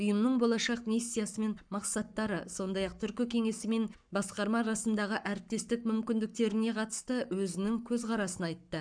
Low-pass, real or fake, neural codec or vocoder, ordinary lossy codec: 9.9 kHz; fake; codec, 44.1 kHz, 7.8 kbps, Pupu-Codec; none